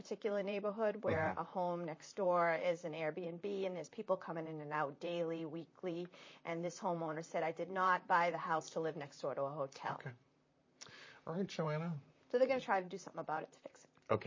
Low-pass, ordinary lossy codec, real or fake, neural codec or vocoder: 7.2 kHz; MP3, 32 kbps; fake; vocoder, 44.1 kHz, 128 mel bands, Pupu-Vocoder